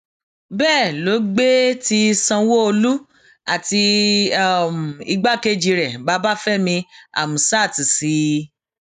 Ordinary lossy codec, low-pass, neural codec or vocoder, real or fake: none; 14.4 kHz; none; real